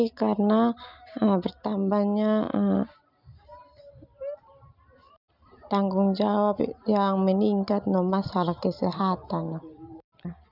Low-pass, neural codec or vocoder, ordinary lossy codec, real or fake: 5.4 kHz; none; none; real